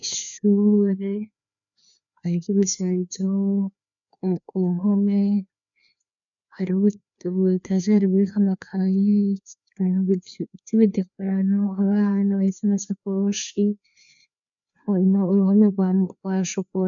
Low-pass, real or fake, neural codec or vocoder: 7.2 kHz; fake; codec, 16 kHz, 2 kbps, FreqCodec, larger model